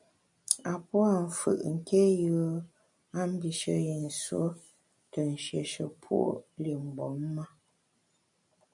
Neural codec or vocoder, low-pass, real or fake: none; 10.8 kHz; real